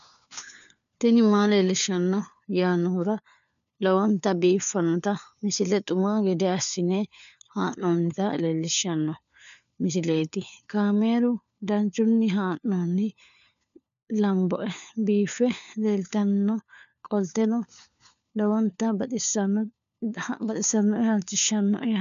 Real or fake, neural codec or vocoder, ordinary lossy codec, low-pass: fake; codec, 16 kHz, 4 kbps, FunCodec, trained on LibriTTS, 50 frames a second; MP3, 96 kbps; 7.2 kHz